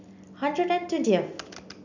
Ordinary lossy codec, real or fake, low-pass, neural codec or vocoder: none; real; 7.2 kHz; none